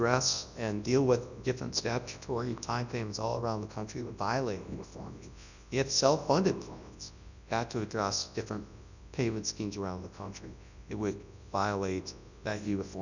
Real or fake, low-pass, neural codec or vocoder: fake; 7.2 kHz; codec, 24 kHz, 0.9 kbps, WavTokenizer, large speech release